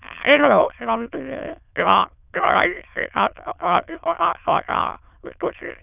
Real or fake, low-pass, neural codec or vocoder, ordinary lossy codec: fake; 3.6 kHz; autoencoder, 22.05 kHz, a latent of 192 numbers a frame, VITS, trained on many speakers; none